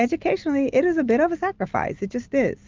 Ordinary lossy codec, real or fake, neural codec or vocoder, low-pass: Opus, 32 kbps; real; none; 7.2 kHz